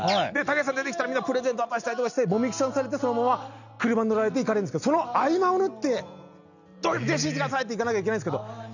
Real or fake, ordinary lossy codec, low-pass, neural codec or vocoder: real; none; 7.2 kHz; none